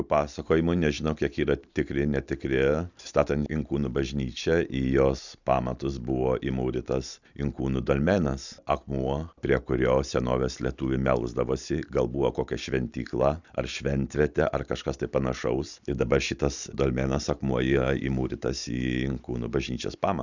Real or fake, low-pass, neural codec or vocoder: real; 7.2 kHz; none